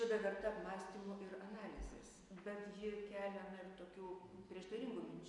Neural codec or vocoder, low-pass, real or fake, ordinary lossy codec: none; 10.8 kHz; real; MP3, 64 kbps